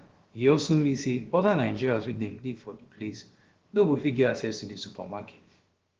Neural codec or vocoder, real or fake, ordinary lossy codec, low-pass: codec, 16 kHz, about 1 kbps, DyCAST, with the encoder's durations; fake; Opus, 16 kbps; 7.2 kHz